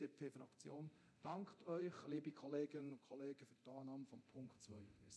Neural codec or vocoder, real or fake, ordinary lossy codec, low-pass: codec, 24 kHz, 0.9 kbps, DualCodec; fake; none; none